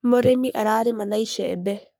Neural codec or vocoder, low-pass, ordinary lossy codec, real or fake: codec, 44.1 kHz, 3.4 kbps, Pupu-Codec; none; none; fake